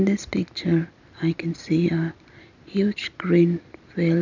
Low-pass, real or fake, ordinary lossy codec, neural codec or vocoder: 7.2 kHz; real; none; none